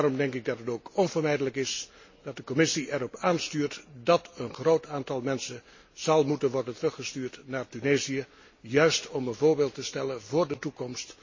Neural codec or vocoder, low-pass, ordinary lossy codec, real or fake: none; 7.2 kHz; MP3, 32 kbps; real